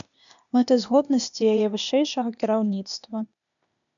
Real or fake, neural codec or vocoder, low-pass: fake; codec, 16 kHz, 0.8 kbps, ZipCodec; 7.2 kHz